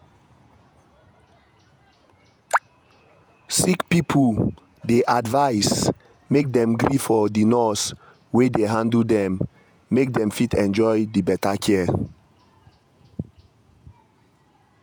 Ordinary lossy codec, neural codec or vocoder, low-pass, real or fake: none; vocoder, 48 kHz, 128 mel bands, Vocos; none; fake